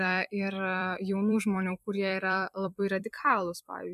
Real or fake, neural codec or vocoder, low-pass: fake; vocoder, 44.1 kHz, 128 mel bands every 256 samples, BigVGAN v2; 14.4 kHz